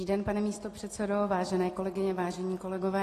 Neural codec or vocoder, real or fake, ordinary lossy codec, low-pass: none; real; AAC, 48 kbps; 14.4 kHz